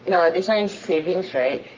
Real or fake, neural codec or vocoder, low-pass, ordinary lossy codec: fake; codec, 44.1 kHz, 3.4 kbps, Pupu-Codec; 7.2 kHz; Opus, 32 kbps